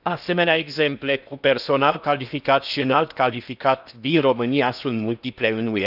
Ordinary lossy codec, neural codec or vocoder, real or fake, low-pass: none; codec, 16 kHz in and 24 kHz out, 0.8 kbps, FocalCodec, streaming, 65536 codes; fake; 5.4 kHz